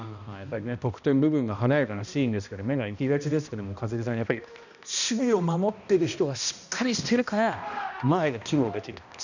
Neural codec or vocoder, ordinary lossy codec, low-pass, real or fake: codec, 16 kHz, 1 kbps, X-Codec, HuBERT features, trained on balanced general audio; none; 7.2 kHz; fake